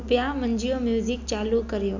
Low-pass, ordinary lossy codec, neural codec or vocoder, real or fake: 7.2 kHz; none; none; real